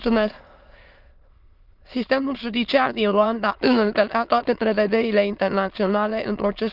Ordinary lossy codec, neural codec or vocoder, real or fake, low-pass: Opus, 32 kbps; autoencoder, 22.05 kHz, a latent of 192 numbers a frame, VITS, trained on many speakers; fake; 5.4 kHz